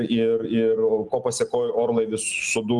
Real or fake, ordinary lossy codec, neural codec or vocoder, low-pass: real; Opus, 24 kbps; none; 10.8 kHz